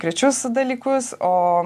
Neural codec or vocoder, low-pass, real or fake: none; 14.4 kHz; real